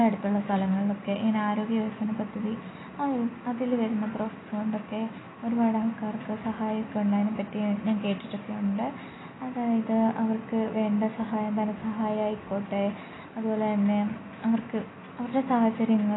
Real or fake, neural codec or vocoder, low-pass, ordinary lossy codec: real; none; 7.2 kHz; AAC, 16 kbps